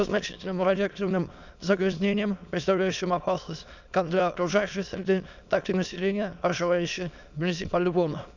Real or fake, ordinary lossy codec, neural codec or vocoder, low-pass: fake; none; autoencoder, 22.05 kHz, a latent of 192 numbers a frame, VITS, trained on many speakers; 7.2 kHz